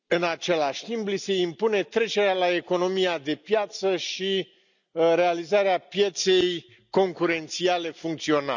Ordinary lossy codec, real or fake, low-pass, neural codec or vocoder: none; real; 7.2 kHz; none